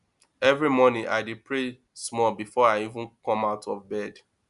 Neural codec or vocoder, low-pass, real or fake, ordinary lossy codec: none; 10.8 kHz; real; none